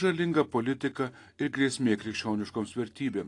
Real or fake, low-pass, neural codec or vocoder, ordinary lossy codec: real; 10.8 kHz; none; AAC, 48 kbps